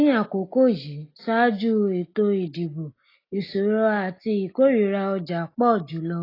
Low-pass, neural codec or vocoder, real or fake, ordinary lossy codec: 5.4 kHz; none; real; AAC, 24 kbps